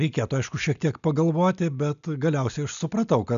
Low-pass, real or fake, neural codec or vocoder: 7.2 kHz; real; none